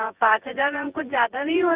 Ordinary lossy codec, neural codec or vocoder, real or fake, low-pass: Opus, 24 kbps; vocoder, 24 kHz, 100 mel bands, Vocos; fake; 3.6 kHz